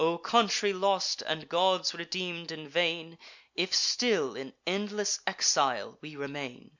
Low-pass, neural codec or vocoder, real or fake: 7.2 kHz; none; real